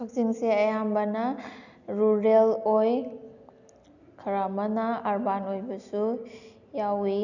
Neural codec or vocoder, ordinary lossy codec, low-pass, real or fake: none; none; 7.2 kHz; real